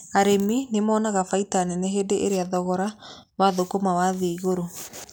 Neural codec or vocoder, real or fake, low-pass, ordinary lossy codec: none; real; none; none